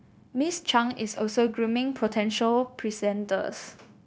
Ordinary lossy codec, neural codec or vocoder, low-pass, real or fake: none; codec, 16 kHz, 0.9 kbps, LongCat-Audio-Codec; none; fake